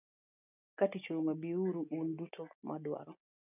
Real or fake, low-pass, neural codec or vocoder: real; 3.6 kHz; none